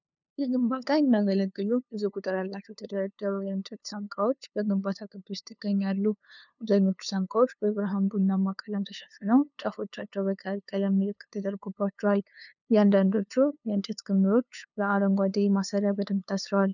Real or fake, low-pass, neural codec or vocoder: fake; 7.2 kHz; codec, 16 kHz, 2 kbps, FunCodec, trained on LibriTTS, 25 frames a second